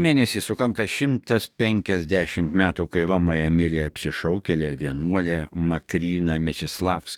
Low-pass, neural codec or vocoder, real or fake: 19.8 kHz; codec, 44.1 kHz, 2.6 kbps, DAC; fake